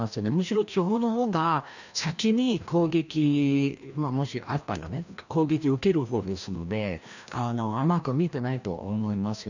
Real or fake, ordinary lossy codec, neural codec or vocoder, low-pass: fake; none; codec, 16 kHz, 1 kbps, FreqCodec, larger model; 7.2 kHz